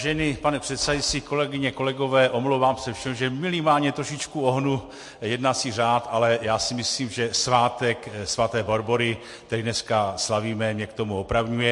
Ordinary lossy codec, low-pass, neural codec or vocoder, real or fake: MP3, 48 kbps; 10.8 kHz; none; real